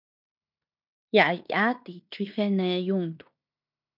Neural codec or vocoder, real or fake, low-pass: codec, 16 kHz in and 24 kHz out, 0.9 kbps, LongCat-Audio-Codec, fine tuned four codebook decoder; fake; 5.4 kHz